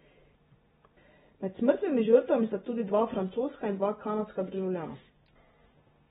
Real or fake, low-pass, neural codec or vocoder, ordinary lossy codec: real; 19.8 kHz; none; AAC, 16 kbps